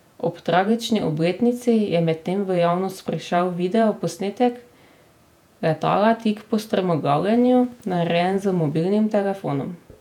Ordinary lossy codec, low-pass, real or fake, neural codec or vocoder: none; 19.8 kHz; fake; vocoder, 48 kHz, 128 mel bands, Vocos